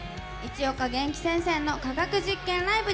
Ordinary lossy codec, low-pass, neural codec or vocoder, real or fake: none; none; none; real